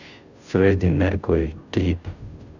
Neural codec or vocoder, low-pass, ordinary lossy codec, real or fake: codec, 16 kHz, 0.5 kbps, FunCodec, trained on Chinese and English, 25 frames a second; 7.2 kHz; none; fake